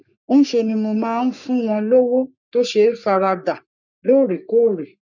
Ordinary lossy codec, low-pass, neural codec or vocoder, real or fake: none; 7.2 kHz; codec, 44.1 kHz, 3.4 kbps, Pupu-Codec; fake